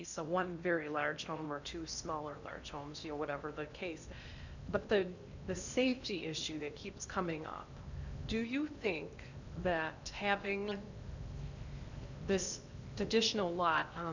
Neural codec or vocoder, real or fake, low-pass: codec, 16 kHz in and 24 kHz out, 0.8 kbps, FocalCodec, streaming, 65536 codes; fake; 7.2 kHz